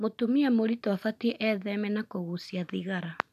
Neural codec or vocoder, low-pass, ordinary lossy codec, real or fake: none; 14.4 kHz; none; real